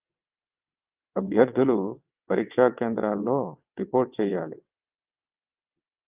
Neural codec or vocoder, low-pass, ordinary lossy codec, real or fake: vocoder, 22.05 kHz, 80 mel bands, WaveNeXt; 3.6 kHz; Opus, 24 kbps; fake